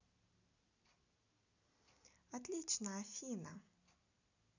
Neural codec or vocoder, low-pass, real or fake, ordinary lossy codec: none; 7.2 kHz; real; none